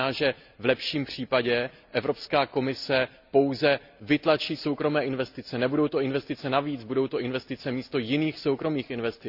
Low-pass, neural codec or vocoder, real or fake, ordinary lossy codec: 5.4 kHz; none; real; none